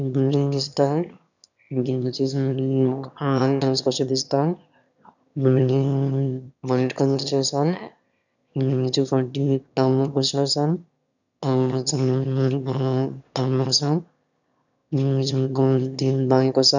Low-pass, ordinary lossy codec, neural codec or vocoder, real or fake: 7.2 kHz; none; autoencoder, 22.05 kHz, a latent of 192 numbers a frame, VITS, trained on one speaker; fake